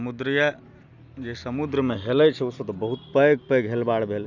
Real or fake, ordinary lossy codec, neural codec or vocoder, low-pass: real; none; none; 7.2 kHz